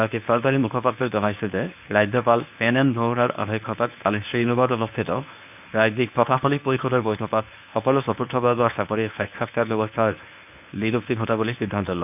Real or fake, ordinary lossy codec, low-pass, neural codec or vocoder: fake; none; 3.6 kHz; codec, 24 kHz, 0.9 kbps, WavTokenizer, medium speech release version 1